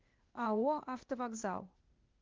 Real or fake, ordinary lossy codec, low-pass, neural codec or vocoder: fake; Opus, 32 kbps; 7.2 kHz; codec, 16 kHz, 0.8 kbps, ZipCodec